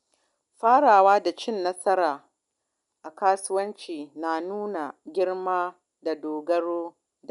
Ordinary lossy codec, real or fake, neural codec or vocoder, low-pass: none; real; none; 10.8 kHz